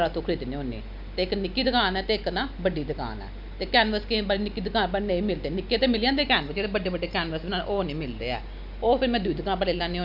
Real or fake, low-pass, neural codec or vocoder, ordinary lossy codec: real; 5.4 kHz; none; none